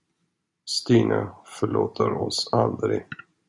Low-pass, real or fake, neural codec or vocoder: 10.8 kHz; real; none